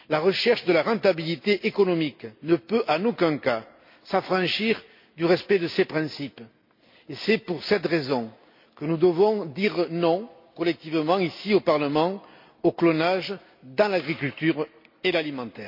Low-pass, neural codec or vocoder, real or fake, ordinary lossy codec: 5.4 kHz; none; real; MP3, 32 kbps